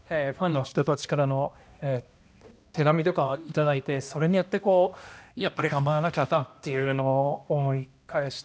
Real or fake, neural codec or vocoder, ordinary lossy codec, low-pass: fake; codec, 16 kHz, 1 kbps, X-Codec, HuBERT features, trained on balanced general audio; none; none